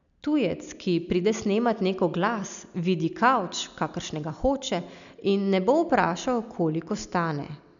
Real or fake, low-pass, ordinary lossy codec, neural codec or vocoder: real; 7.2 kHz; none; none